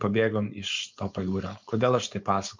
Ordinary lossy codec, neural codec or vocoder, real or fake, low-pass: MP3, 48 kbps; codec, 16 kHz, 4.8 kbps, FACodec; fake; 7.2 kHz